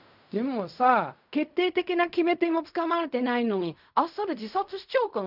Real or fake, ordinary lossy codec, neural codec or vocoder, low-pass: fake; none; codec, 16 kHz in and 24 kHz out, 0.4 kbps, LongCat-Audio-Codec, fine tuned four codebook decoder; 5.4 kHz